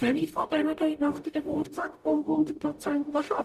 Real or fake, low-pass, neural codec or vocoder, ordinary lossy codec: fake; 14.4 kHz; codec, 44.1 kHz, 0.9 kbps, DAC; Opus, 64 kbps